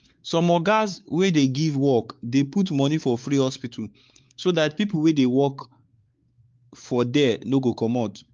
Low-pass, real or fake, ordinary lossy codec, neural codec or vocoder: 7.2 kHz; fake; Opus, 24 kbps; codec, 16 kHz, 4 kbps, X-Codec, WavLM features, trained on Multilingual LibriSpeech